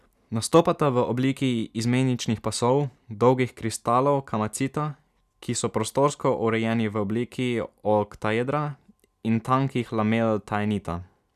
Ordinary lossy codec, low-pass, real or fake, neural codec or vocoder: none; 14.4 kHz; real; none